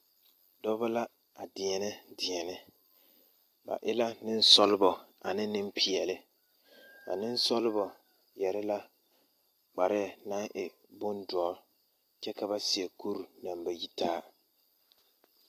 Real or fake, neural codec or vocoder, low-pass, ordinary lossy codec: real; none; 14.4 kHz; AAC, 64 kbps